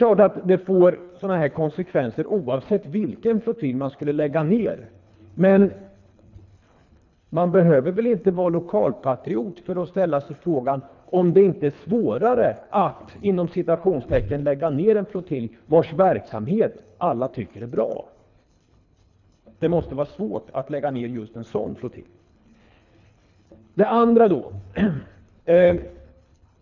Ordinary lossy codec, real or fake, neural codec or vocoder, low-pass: none; fake; codec, 24 kHz, 3 kbps, HILCodec; 7.2 kHz